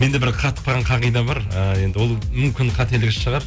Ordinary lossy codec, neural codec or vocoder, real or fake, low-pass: none; none; real; none